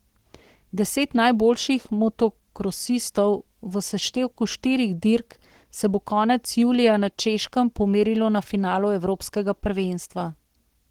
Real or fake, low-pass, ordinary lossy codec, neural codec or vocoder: fake; 19.8 kHz; Opus, 16 kbps; codec, 44.1 kHz, 7.8 kbps, Pupu-Codec